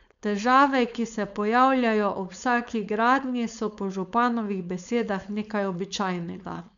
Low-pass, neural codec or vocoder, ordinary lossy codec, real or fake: 7.2 kHz; codec, 16 kHz, 4.8 kbps, FACodec; none; fake